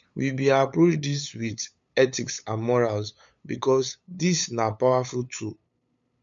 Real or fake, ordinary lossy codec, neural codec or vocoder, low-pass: fake; MP3, 64 kbps; codec, 16 kHz, 8 kbps, FunCodec, trained on LibriTTS, 25 frames a second; 7.2 kHz